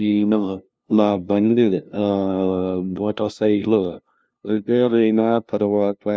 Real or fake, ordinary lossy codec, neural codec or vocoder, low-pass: fake; none; codec, 16 kHz, 0.5 kbps, FunCodec, trained on LibriTTS, 25 frames a second; none